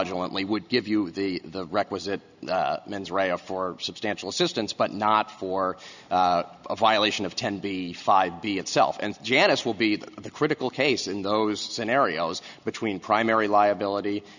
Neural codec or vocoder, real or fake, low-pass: none; real; 7.2 kHz